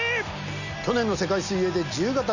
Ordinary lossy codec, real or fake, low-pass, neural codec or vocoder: none; real; 7.2 kHz; none